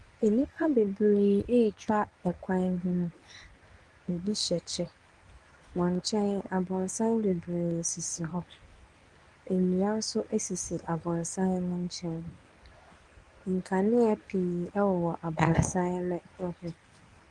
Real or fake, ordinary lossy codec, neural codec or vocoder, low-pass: fake; Opus, 24 kbps; codec, 24 kHz, 0.9 kbps, WavTokenizer, medium speech release version 1; 10.8 kHz